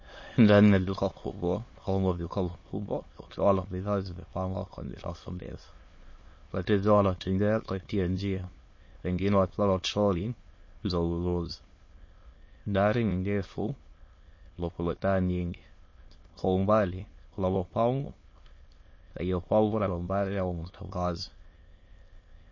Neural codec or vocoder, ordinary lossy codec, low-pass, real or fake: autoencoder, 22.05 kHz, a latent of 192 numbers a frame, VITS, trained on many speakers; MP3, 32 kbps; 7.2 kHz; fake